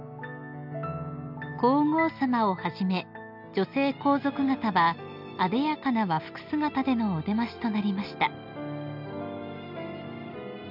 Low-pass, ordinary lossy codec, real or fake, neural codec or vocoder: 5.4 kHz; none; real; none